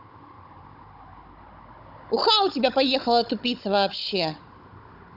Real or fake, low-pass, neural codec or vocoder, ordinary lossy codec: fake; 5.4 kHz; codec, 16 kHz, 16 kbps, FunCodec, trained on Chinese and English, 50 frames a second; none